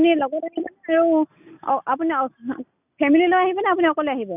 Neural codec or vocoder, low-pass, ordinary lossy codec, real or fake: none; 3.6 kHz; none; real